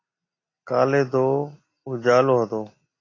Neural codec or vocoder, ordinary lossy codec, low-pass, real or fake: none; AAC, 32 kbps; 7.2 kHz; real